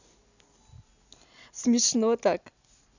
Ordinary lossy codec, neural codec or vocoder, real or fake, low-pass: none; none; real; 7.2 kHz